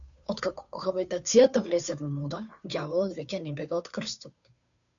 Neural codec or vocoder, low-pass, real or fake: codec, 16 kHz, 2 kbps, FunCodec, trained on Chinese and English, 25 frames a second; 7.2 kHz; fake